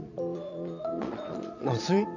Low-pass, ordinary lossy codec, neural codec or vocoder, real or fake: 7.2 kHz; none; vocoder, 22.05 kHz, 80 mel bands, Vocos; fake